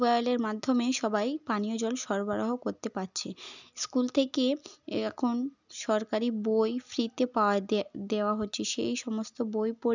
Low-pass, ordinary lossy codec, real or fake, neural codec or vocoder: 7.2 kHz; none; real; none